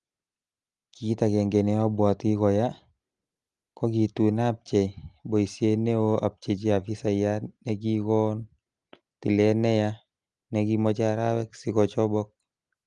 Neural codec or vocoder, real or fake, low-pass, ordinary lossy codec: none; real; 10.8 kHz; Opus, 32 kbps